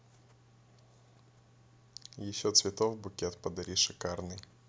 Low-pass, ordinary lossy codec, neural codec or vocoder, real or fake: none; none; none; real